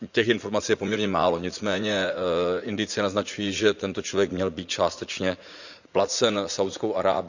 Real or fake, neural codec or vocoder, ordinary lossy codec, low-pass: fake; vocoder, 44.1 kHz, 80 mel bands, Vocos; none; 7.2 kHz